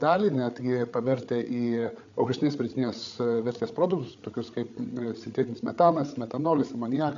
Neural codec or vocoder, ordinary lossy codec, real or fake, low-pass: codec, 16 kHz, 8 kbps, FreqCodec, larger model; AAC, 64 kbps; fake; 7.2 kHz